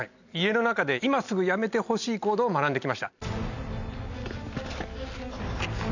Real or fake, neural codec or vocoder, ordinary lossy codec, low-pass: real; none; none; 7.2 kHz